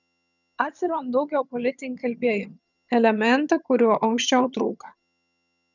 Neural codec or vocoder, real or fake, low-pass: vocoder, 22.05 kHz, 80 mel bands, HiFi-GAN; fake; 7.2 kHz